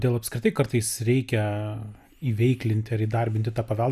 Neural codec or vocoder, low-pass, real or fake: none; 14.4 kHz; real